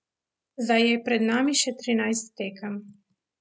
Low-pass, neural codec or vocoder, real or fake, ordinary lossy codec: none; none; real; none